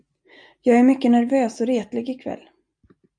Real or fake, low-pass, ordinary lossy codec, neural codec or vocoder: real; 9.9 kHz; MP3, 64 kbps; none